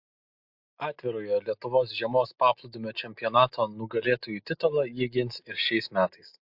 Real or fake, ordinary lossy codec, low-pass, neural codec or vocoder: real; MP3, 48 kbps; 5.4 kHz; none